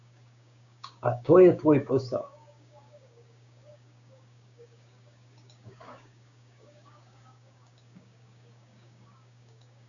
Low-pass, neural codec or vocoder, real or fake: 7.2 kHz; codec, 16 kHz, 6 kbps, DAC; fake